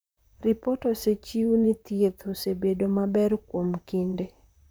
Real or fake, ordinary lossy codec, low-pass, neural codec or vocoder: fake; none; none; vocoder, 44.1 kHz, 128 mel bands, Pupu-Vocoder